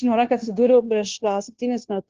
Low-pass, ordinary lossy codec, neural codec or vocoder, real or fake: 7.2 kHz; Opus, 16 kbps; codec, 16 kHz, 0.9 kbps, LongCat-Audio-Codec; fake